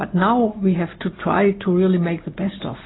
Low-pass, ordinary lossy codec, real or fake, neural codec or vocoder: 7.2 kHz; AAC, 16 kbps; real; none